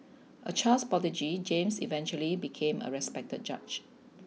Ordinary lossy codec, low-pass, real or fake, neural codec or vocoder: none; none; real; none